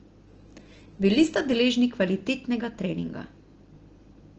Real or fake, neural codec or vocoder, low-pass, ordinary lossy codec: real; none; 7.2 kHz; Opus, 16 kbps